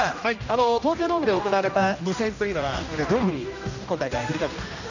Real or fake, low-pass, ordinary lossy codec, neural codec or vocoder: fake; 7.2 kHz; none; codec, 16 kHz, 1 kbps, X-Codec, HuBERT features, trained on general audio